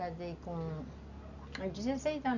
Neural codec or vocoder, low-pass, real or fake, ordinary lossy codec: none; 7.2 kHz; real; none